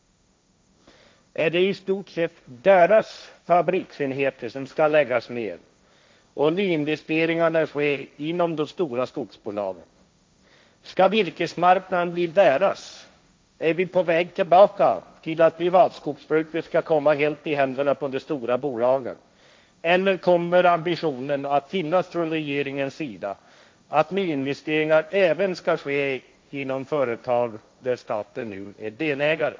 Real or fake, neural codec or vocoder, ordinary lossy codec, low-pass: fake; codec, 16 kHz, 1.1 kbps, Voila-Tokenizer; none; none